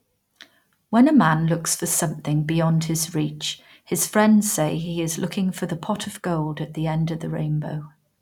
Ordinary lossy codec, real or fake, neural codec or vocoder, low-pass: none; real; none; 19.8 kHz